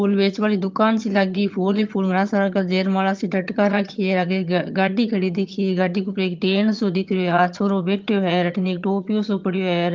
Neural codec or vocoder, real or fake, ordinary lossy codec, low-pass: vocoder, 22.05 kHz, 80 mel bands, HiFi-GAN; fake; Opus, 24 kbps; 7.2 kHz